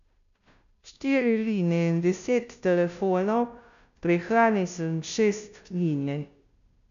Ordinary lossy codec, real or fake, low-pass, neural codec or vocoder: none; fake; 7.2 kHz; codec, 16 kHz, 0.5 kbps, FunCodec, trained on Chinese and English, 25 frames a second